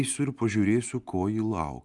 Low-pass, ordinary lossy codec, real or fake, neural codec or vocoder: 10.8 kHz; Opus, 32 kbps; real; none